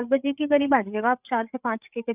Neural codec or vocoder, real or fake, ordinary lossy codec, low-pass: codec, 16 kHz, 8 kbps, FreqCodec, larger model; fake; none; 3.6 kHz